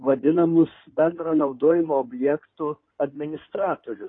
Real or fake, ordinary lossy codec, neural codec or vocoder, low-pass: fake; AAC, 32 kbps; codec, 16 kHz in and 24 kHz out, 2.2 kbps, FireRedTTS-2 codec; 9.9 kHz